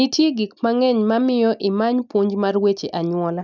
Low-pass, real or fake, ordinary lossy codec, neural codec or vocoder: 7.2 kHz; real; none; none